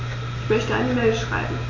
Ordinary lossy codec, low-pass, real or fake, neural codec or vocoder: AAC, 32 kbps; 7.2 kHz; real; none